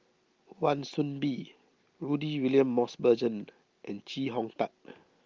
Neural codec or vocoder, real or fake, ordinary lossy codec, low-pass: none; real; Opus, 32 kbps; 7.2 kHz